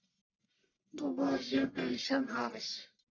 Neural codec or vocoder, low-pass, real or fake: codec, 44.1 kHz, 1.7 kbps, Pupu-Codec; 7.2 kHz; fake